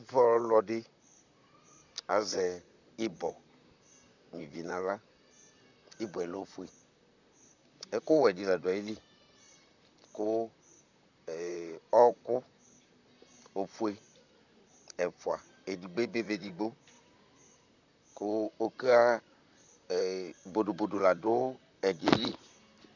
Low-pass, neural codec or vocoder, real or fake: 7.2 kHz; vocoder, 44.1 kHz, 128 mel bands, Pupu-Vocoder; fake